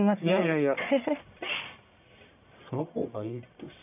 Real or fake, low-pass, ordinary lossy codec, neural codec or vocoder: fake; 3.6 kHz; none; codec, 44.1 kHz, 1.7 kbps, Pupu-Codec